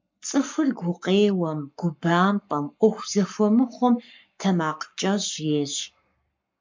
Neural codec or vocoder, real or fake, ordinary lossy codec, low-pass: codec, 44.1 kHz, 7.8 kbps, Pupu-Codec; fake; MP3, 64 kbps; 7.2 kHz